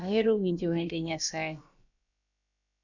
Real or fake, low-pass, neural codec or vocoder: fake; 7.2 kHz; codec, 16 kHz, about 1 kbps, DyCAST, with the encoder's durations